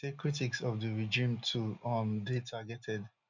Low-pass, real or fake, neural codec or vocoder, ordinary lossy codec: 7.2 kHz; real; none; none